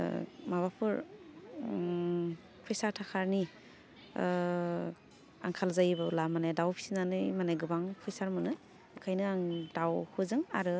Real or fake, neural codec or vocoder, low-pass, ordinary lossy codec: real; none; none; none